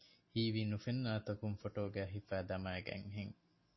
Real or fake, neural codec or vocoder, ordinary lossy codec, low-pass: real; none; MP3, 24 kbps; 7.2 kHz